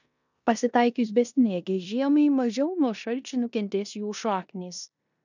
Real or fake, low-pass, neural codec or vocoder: fake; 7.2 kHz; codec, 16 kHz in and 24 kHz out, 0.9 kbps, LongCat-Audio-Codec, four codebook decoder